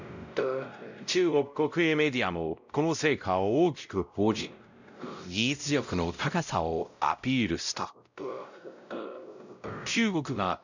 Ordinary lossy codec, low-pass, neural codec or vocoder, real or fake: none; 7.2 kHz; codec, 16 kHz, 0.5 kbps, X-Codec, WavLM features, trained on Multilingual LibriSpeech; fake